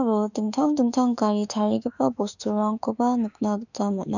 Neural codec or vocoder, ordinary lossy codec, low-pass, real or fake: autoencoder, 48 kHz, 32 numbers a frame, DAC-VAE, trained on Japanese speech; none; 7.2 kHz; fake